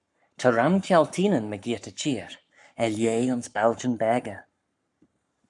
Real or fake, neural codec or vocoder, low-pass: fake; codec, 44.1 kHz, 7.8 kbps, Pupu-Codec; 10.8 kHz